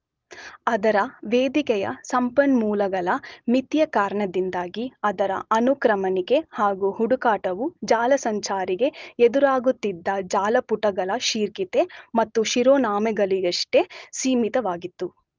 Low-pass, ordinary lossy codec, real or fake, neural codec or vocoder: 7.2 kHz; Opus, 24 kbps; real; none